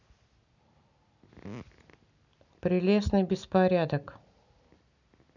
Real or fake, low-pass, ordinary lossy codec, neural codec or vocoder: real; 7.2 kHz; none; none